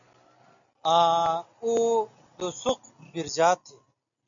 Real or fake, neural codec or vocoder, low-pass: real; none; 7.2 kHz